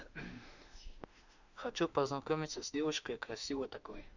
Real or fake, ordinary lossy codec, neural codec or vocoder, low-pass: fake; none; autoencoder, 48 kHz, 32 numbers a frame, DAC-VAE, trained on Japanese speech; 7.2 kHz